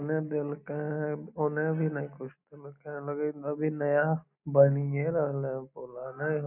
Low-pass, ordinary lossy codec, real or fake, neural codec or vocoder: 3.6 kHz; none; real; none